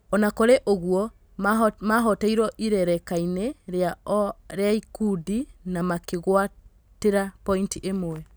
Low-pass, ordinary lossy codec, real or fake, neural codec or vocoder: none; none; real; none